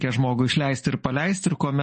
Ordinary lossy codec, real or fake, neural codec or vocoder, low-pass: MP3, 32 kbps; real; none; 10.8 kHz